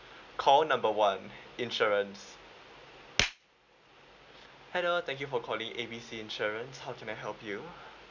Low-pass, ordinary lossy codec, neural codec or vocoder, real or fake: 7.2 kHz; none; none; real